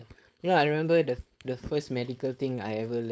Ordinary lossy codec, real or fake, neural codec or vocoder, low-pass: none; fake; codec, 16 kHz, 4.8 kbps, FACodec; none